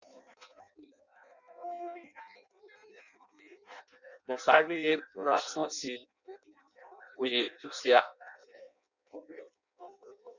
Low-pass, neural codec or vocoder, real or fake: 7.2 kHz; codec, 16 kHz in and 24 kHz out, 0.6 kbps, FireRedTTS-2 codec; fake